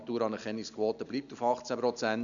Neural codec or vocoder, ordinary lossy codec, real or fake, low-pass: none; none; real; 7.2 kHz